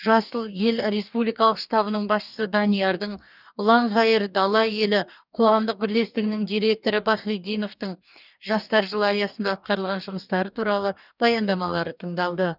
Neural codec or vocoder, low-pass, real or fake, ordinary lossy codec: codec, 44.1 kHz, 2.6 kbps, DAC; 5.4 kHz; fake; none